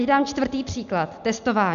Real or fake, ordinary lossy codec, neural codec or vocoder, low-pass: real; MP3, 96 kbps; none; 7.2 kHz